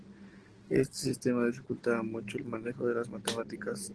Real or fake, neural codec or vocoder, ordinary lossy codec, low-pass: real; none; Opus, 16 kbps; 10.8 kHz